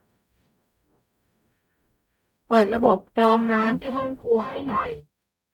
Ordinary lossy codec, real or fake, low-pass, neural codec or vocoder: none; fake; 19.8 kHz; codec, 44.1 kHz, 0.9 kbps, DAC